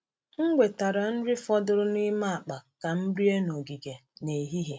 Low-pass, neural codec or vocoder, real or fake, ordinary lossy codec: none; none; real; none